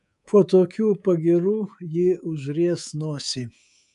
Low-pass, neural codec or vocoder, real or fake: 10.8 kHz; codec, 24 kHz, 3.1 kbps, DualCodec; fake